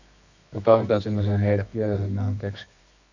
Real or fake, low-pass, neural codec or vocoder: fake; 7.2 kHz; codec, 24 kHz, 0.9 kbps, WavTokenizer, medium music audio release